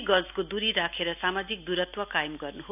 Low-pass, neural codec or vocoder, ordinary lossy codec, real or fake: 3.6 kHz; none; none; real